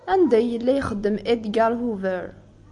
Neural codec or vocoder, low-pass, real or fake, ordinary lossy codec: none; 10.8 kHz; real; AAC, 64 kbps